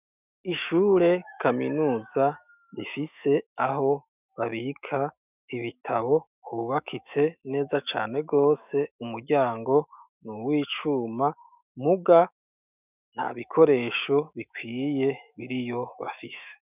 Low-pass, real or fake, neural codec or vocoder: 3.6 kHz; fake; autoencoder, 48 kHz, 128 numbers a frame, DAC-VAE, trained on Japanese speech